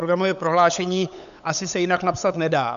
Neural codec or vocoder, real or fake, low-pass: codec, 16 kHz, 8 kbps, FunCodec, trained on LibriTTS, 25 frames a second; fake; 7.2 kHz